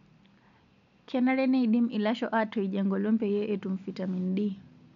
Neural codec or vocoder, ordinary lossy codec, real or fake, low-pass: none; none; real; 7.2 kHz